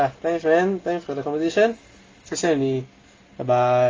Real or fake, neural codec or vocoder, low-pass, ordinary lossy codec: real; none; 7.2 kHz; Opus, 32 kbps